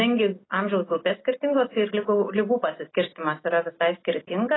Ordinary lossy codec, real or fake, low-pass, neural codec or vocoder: AAC, 16 kbps; real; 7.2 kHz; none